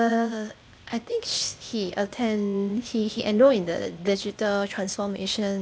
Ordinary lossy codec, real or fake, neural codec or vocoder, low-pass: none; fake; codec, 16 kHz, 0.8 kbps, ZipCodec; none